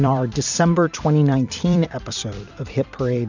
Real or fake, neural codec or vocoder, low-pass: fake; vocoder, 44.1 kHz, 128 mel bands every 256 samples, BigVGAN v2; 7.2 kHz